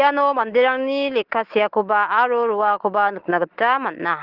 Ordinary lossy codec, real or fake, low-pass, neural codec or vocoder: Opus, 16 kbps; real; 5.4 kHz; none